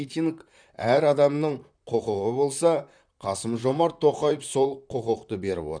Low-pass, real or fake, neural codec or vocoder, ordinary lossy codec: 9.9 kHz; fake; vocoder, 44.1 kHz, 128 mel bands, Pupu-Vocoder; none